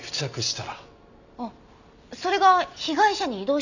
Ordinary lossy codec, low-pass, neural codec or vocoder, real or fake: none; 7.2 kHz; none; real